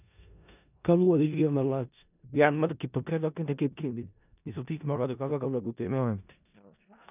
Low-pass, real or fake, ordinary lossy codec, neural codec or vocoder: 3.6 kHz; fake; none; codec, 16 kHz in and 24 kHz out, 0.4 kbps, LongCat-Audio-Codec, four codebook decoder